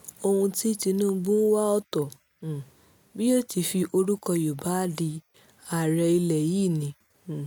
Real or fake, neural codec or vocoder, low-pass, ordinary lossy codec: real; none; none; none